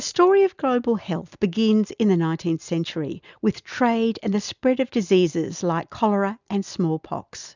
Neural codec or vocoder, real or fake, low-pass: none; real; 7.2 kHz